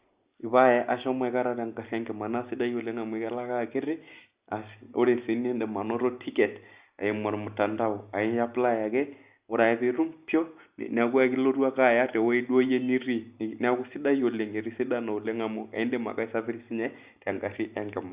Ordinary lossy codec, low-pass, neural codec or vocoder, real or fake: Opus, 64 kbps; 3.6 kHz; none; real